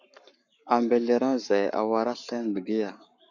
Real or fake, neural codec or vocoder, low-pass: fake; codec, 44.1 kHz, 7.8 kbps, Pupu-Codec; 7.2 kHz